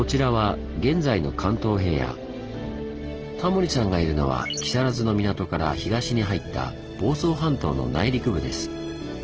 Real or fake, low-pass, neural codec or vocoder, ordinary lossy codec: real; 7.2 kHz; none; Opus, 16 kbps